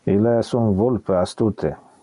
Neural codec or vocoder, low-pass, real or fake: none; 10.8 kHz; real